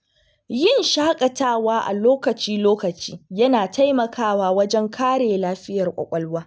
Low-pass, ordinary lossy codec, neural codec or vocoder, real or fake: none; none; none; real